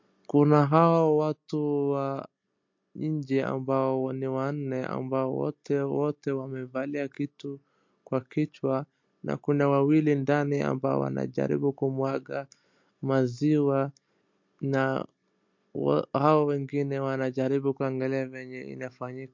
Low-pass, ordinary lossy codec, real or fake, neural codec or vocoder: 7.2 kHz; MP3, 48 kbps; real; none